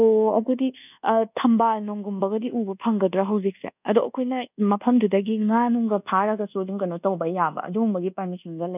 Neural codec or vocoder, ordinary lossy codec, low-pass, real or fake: codec, 24 kHz, 1.2 kbps, DualCodec; none; 3.6 kHz; fake